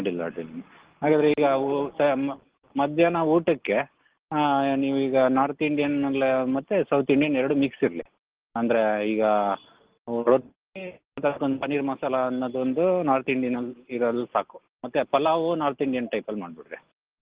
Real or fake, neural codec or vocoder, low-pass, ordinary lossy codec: real; none; 3.6 kHz; Opus, 16 kbps